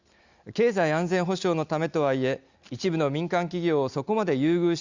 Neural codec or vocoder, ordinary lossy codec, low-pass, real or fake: none; Opus, 64 kbps; 7.2 kHz; real